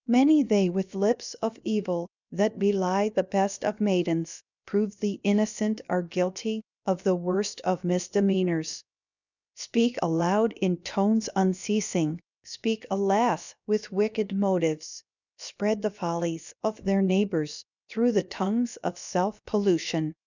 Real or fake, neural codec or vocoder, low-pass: fake; codec, 16 kHz, about 1 kbps, DyCAST, with the encoder's durations; 7.2 kHz